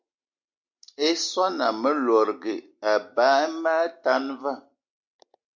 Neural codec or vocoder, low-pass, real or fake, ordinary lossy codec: none; 7.2 kHz; real; MP3, 64 kbps